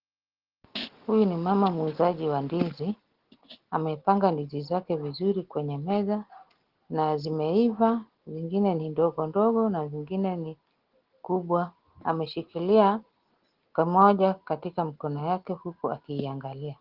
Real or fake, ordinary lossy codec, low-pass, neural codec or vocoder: real; Opus, 16 kbps; 5.4 kHz; none